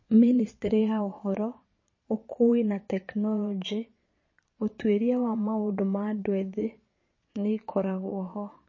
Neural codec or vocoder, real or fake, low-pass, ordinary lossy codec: vocoder, 22.05 kHz, 80 mel bands, Vocos; fake; 7.2 kHz; MP3, 32 kbps